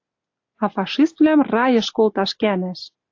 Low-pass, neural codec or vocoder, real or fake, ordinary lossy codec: 7.2 kHz; none; real; AAC, 48 kbps